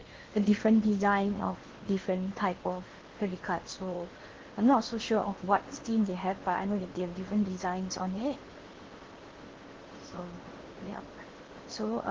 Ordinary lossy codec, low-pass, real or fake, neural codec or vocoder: Opus, 16 kbps; 7.2 kHz; fake; codec, 16 kHz in and 24 kHz out, 0.8 kbps, FocalCodec, streaming, 65536 codes